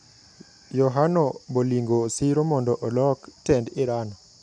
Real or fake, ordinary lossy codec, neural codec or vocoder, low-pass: real; none; none; 9.9 kHz